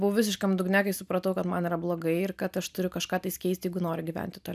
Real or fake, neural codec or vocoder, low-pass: real; none; 14.4 kHz